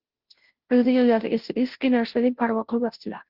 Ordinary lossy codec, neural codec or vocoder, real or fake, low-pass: Opus, 16 kbps; codec, 16 kHz, 0.5 kbps, FunCodec, trained on Chinese and English, 25 frames a second; fake; 5.4 kHz